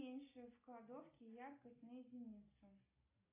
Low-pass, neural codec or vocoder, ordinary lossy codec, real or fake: 3.6 kHz; none; AAC, 24 kbps; real